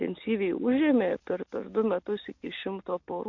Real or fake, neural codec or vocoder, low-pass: real; none; 7.2 kHz